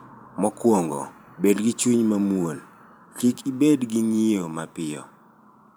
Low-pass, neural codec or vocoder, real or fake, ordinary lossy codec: none; vocoder, 44.1 kHz, 128 mel bands every 512 samples, BigVGAN v2; fake; none